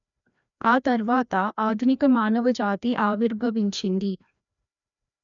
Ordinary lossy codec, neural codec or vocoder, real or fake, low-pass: none; codec, 16 kHz, 1 kbps, FreqCodec, larger model; fake; 7.2 kHz